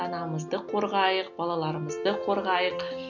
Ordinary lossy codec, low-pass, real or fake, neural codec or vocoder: none; 7.2 kHz; real; none